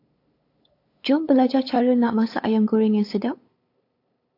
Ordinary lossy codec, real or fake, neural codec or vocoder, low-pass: AAC, 32 kbps; fake; codec, 16 kHz, 8 kbps, FunCodec, trained on LibriTTS, 25 frames a second; 5.4 kHz